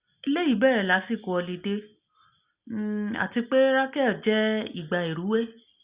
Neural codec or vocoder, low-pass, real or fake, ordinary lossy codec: none; 3.6 kHz; real; Opus, 64 kbps